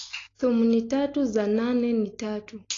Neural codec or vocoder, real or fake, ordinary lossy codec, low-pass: none; real; AAC, 32 kbps; 7.2 kHz